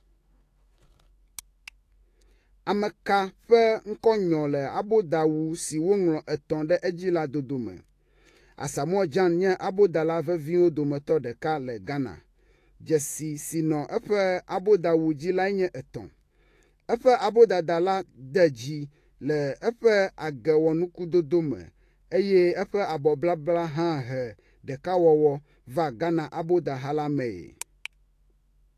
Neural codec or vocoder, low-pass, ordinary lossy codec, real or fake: autoencoder, 48 kHz, 128 numbers a frame, DAC-VAE, trained on Japanese speech; 14.4 kHz; AAC, 48 kbps; fake